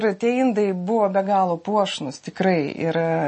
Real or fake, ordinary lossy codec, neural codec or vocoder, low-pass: real; MP3, 32 kbps; none; 10.8 kHz